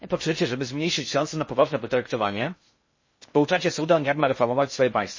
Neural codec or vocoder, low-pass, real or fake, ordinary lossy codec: codec, 16 kHz in and 24 kHz out, 0.6 kbps, FocalCodec, streaming, 2048 codes; 7.2 kHz; fake; MP3, 32 kbps